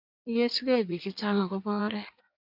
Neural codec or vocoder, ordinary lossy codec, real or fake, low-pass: codec, 16 kHz in and 24 kHz out, 1.1 kbps, FireRedTTS-2 codec; MP3, 48 kbps; fake; 5.4 kHz